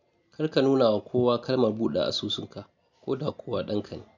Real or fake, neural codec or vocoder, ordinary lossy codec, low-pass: real; none; none; 7.2 kHz